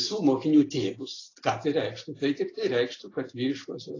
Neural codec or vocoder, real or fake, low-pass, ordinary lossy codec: none; real; 7.2 kHz; AAC, 32 kbps